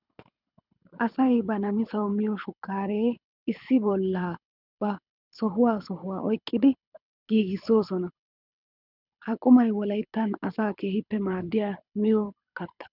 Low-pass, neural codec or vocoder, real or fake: 5.4 kHz; codec, 24 kHz, 6 kbps, HILCodec; fake